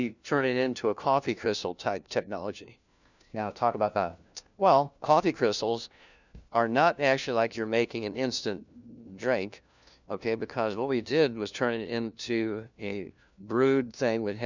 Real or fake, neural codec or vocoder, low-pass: fake; codec, 16 kHz, 1 kbps, FunCodec, trained on LibriTTS, 50 frames a second; 7.2 kHz